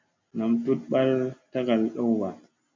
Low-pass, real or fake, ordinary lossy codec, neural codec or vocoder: 7.2 kHz; real; MP3, 64 kbps; none